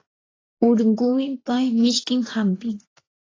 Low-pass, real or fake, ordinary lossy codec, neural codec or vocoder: 7.2 kHz; fake; AAC, 32 kbps; vocoder, 24 kHz, 100 mel bands, Vocos